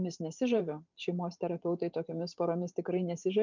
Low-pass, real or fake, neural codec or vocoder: 7.2 kHz; fake; vocoder, 44.1 kHz, 128 mel bands every 512 samples, BigVGAN v2